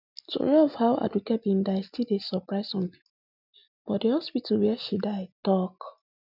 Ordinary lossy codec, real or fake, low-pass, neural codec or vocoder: none; real; 5.4 kHz; none